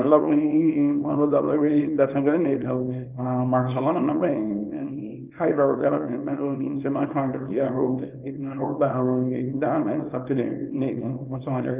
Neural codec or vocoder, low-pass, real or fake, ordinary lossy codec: codec, 24 kHz, 0.9 kbps, WavTokenizer, small release; 3.6 kHz; fake; Opus, 24 kbps